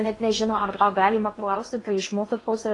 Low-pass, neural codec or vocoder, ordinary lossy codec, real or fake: 10.8 kHz; codec, 16 kHz in and 24 kHz out, 0.8 kbps, FocalCodec, streaming, 65536 codes; AAC, 32 kbps; fake